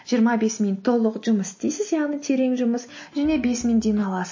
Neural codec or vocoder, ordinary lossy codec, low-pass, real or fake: none; MP3, 32 kbps; 7.2 kHz; real